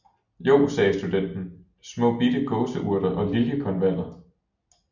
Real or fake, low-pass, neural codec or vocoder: real; 7.2 kHz; none